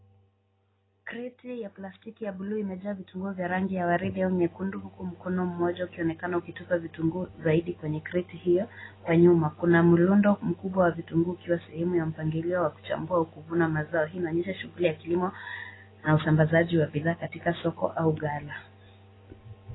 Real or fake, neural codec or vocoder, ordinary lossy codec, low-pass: real; none; AAC, 16 kbps; 7.2 kHz